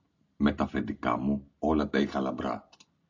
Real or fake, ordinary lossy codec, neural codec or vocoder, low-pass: real; AAC, 48 kbps; none; 7.2 kHz